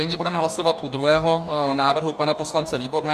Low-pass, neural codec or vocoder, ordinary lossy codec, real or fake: 14.4 kHz; codec, 44.1 kHz, 2.6 kbps, DAC; MP3, 96 kbps; fake